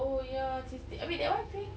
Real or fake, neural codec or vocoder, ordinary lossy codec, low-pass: real; none; none; none